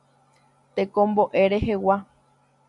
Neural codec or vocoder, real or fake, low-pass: none; real; 10.8 kHz